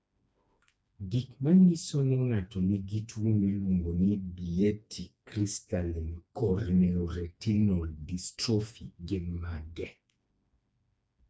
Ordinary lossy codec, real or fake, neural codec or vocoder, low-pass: none; fake; codec, 16 kHz, 2 kbps, FreqCodec, smaller model; none